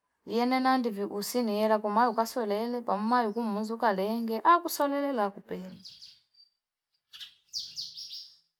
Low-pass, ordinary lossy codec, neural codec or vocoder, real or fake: 19.8 kHz; none; none; real